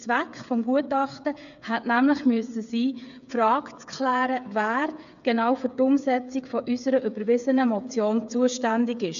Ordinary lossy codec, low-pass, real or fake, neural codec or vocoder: AAC, 96 kbps; 7.2 kHz; fake; codec, 16 kHz, 8 kbps, FreqCodec, smaller model